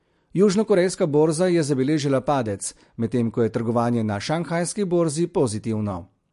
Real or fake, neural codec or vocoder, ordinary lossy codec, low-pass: real; none; MP3, 48 kbps; 14.4 kHz